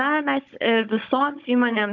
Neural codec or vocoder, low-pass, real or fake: codec, 16 kHz, 16 kbps, FunCodec, trained on Chinese and English, 50 frames a second; 7.2 kHz; fake